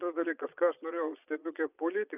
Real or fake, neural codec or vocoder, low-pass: fake; vocoder, 22.05 kHz, 80 mel bands, Vocos; 3.6 kHz